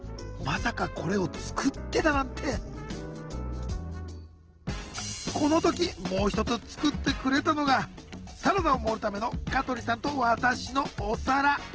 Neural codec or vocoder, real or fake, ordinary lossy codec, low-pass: none; real; Opus, 16 kbps; 7.2 kHz